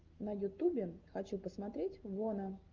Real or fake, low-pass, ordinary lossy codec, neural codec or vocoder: real; 7.2 kHz; Opus, 32 kbps; none